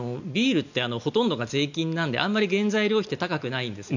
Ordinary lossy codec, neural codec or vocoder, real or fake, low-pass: none; none; real; 7.2 kHz